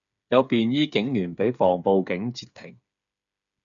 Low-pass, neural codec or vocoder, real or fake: 7.2 kHz; codec, 16 kHz, 8 kbps, FreqCodec, smaller model; fake